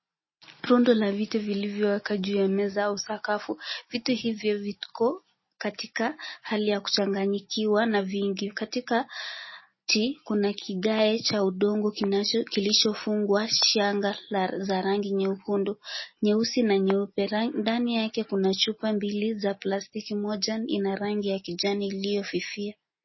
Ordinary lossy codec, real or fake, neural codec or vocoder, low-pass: MP3, 24 kbps; real; none; 7.2 kHz